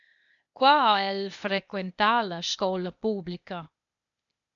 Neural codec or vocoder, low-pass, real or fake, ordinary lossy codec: codec, 16 kHz, 0.8 kbps, ZipCodec; 7.2 kHz; fake; MP3, 64 kbps